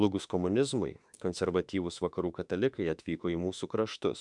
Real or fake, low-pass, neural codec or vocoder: fake; 10.8 kHz; autoencoder, 48 kHz, 32 numbers a frame, DAC-VAE, trained on Japanese speech